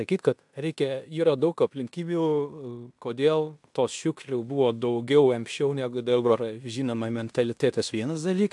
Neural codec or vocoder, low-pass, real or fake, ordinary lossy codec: codec, 16 kHz in and 24 kHz out, 0.9 kbps, LongCat-Audio-Codec, fine tuned four codebook decoder; 10.8 kHz; fake; AAC, 64 kbps